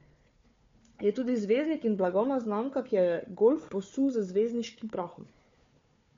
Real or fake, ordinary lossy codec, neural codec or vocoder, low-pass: fake; MP3, 48 kbps; codec, 16 kHz, 4 kbps, FunCodec, trained on Chinese and English, 50 frames a second; 7.2 kHz